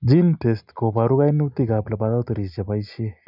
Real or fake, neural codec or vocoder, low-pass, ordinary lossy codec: real; none; 5.4 kHz; none